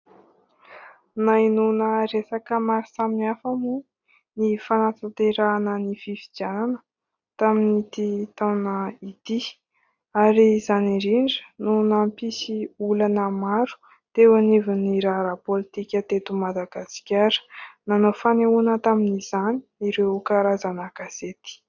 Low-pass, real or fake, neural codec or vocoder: 7.2 kHz; real; none